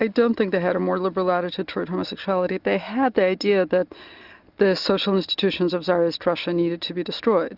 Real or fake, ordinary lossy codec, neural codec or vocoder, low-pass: real; Opus, 64 kbps; none; 5.4 kHz